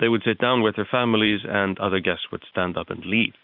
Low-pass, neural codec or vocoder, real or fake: 5.4 kHz; vocoder, 44.1 kHz, 128 mel bands every 512 samples, BigVGAN v2; fake